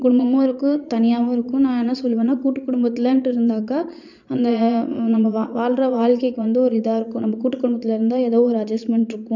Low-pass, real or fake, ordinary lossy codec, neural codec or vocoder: 7.2 kHz; fake; none; vocoder, 22.05 kHz, 80 mel bands, Vocos